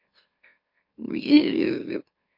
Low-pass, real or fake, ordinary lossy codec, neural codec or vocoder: 5.4 kHz; fake; MP3, 32 kbps; autoencoder, 44.1 kHz, a latent of 192 numbers a frame, MeloTTS